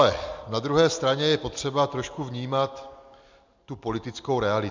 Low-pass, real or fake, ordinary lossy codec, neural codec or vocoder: 7.2 kHz; real; MP3, 64 kbps; none